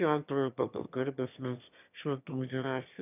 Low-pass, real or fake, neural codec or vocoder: 3.6 kHz; fake; autoencoder, 22.05 kHz, a latent of 192 numbers a frame, VITS, trained on one speaker